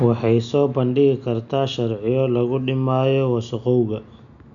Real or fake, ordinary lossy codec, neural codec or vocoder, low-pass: real; none; none; 7.2 kHz